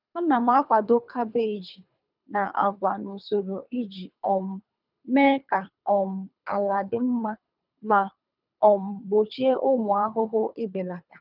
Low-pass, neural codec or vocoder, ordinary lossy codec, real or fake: 5.4 kHz; codec, 24 kHz, 3 kbps, HILCodec; none; fake